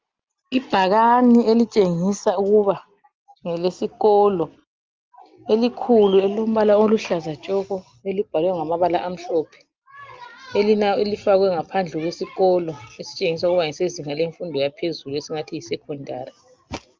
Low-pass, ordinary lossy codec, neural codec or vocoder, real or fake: 7.2 kHz; Opus, 32 kbps; none; real